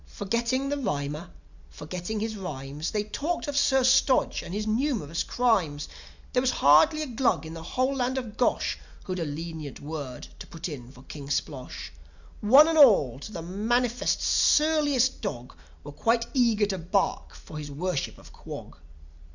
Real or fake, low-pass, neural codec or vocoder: real; 7.2 kHz; none